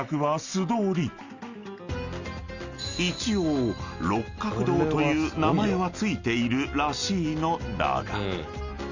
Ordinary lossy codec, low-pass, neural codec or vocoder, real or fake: Opus, 64 kbps; 7.2 kHz; none; real